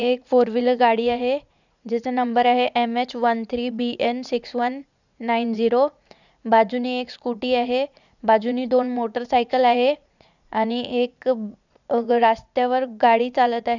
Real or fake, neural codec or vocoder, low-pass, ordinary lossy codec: fake; vocoder, 44.1 kHz, 80 mel bands, Vocos; 7.2 kHz; none